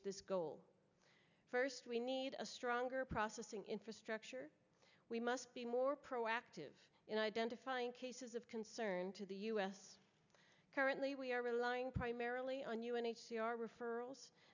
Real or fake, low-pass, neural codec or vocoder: real; 7.2 kHz; none